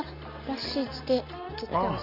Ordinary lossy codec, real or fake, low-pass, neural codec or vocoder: none; real; 5.4 kHz; none